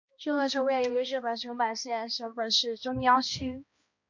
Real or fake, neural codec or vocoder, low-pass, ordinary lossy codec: fake; codec, 16 kHz, 1 kbps, X-Codec, HuBERT features, trained on balanced general audio; 7.2 kHz; MP3, 48 kbps